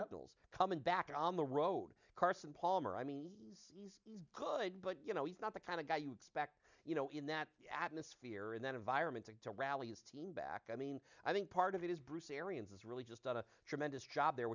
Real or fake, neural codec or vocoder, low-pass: real; none; 7.2 kHz